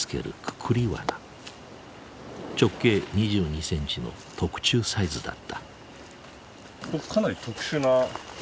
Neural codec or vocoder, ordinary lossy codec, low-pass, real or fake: none; none; none; real